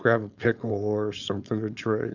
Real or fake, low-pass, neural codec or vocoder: fake; 7.2 kHz; codec, 16 kHz, 4.8 kbps, FACodec